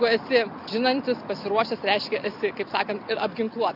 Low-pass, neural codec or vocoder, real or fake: 5.4 kHz; none; real